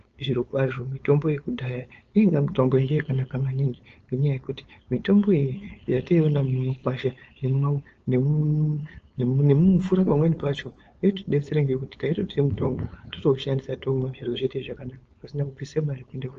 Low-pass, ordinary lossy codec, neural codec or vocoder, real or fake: 7.2 kHz; Opus, 24 kbps; codec, 16 kHz, 4.8 kbps, FACodec; fake